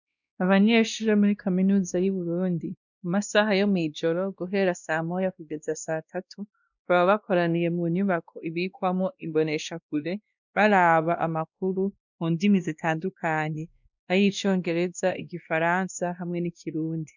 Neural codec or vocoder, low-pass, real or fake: codec, 16 kHz, 1 kbps, X-Codec, WavLM features, trained on Multilingual LibriSpeech; 7.2 kHz; fake